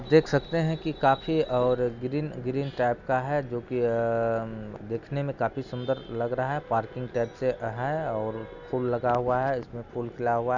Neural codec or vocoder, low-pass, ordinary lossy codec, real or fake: none; 7.2 kHz; none; real